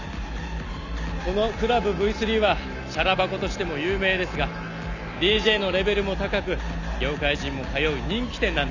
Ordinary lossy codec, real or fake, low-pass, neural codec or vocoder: none; real; 7.2 kHz; none